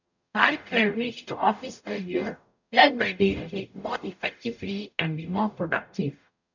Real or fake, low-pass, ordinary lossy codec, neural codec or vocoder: fake; 7.2 kHz; none; codec, 44.1 kHz, 0.9 kbps, DAC